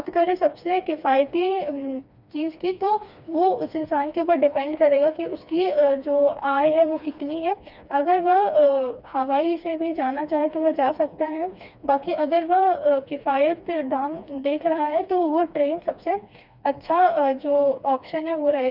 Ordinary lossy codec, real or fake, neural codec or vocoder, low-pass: none; fake; codec, 16 kHz, 2 kbps, FreqCodec, smaller model; 5.4 kHz